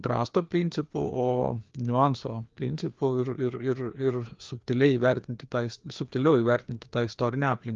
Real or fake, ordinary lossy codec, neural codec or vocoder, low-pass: fake; Opus, 32 kbps; codec, 16 kHz, 2 kbps, FreqCodec, larger model; 7.2 kHz